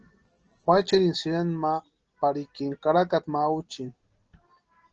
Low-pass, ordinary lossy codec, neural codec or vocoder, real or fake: 7.2 kHz; Opus, 16 kbps; none; real